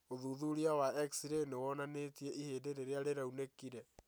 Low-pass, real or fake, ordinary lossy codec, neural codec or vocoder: none; real; none; none